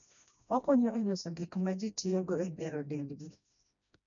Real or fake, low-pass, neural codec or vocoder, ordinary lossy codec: fake; 7.2 kHz; codec, 16 kHz, 1 kbps, FreqCodec, smaller model; none